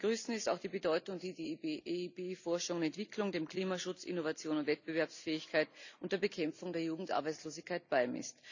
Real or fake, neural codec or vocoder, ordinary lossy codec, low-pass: real; none; none; 7.2 kHz